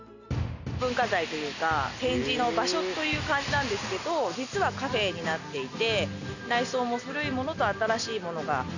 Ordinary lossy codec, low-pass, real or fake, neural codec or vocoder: none; 7.2 kHz; real; none